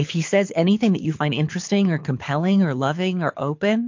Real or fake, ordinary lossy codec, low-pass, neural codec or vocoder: fake; MP3, 48 kbps; 7.2 kHz; codec, 24 kHz, 6 kbps, HILCodec